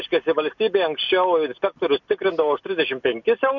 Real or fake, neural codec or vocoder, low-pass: real; none; 7.2 kHz